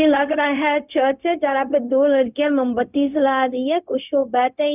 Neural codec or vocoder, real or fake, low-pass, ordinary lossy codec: codec, 16 kHz, 0.4 kbps, LongCat-Audio-Codec; fake; 3.6 kHz; none